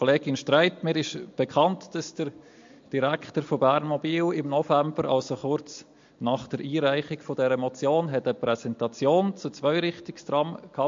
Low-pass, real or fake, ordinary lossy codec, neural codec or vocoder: 7.2 kHz; real; MP3, 48 kbps; none